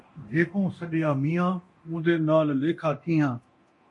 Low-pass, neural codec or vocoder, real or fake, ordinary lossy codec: 10.8 kHz; codec, 24 kHz, 0.9 kbps, DualCodec; fake; MP3, 48 kbps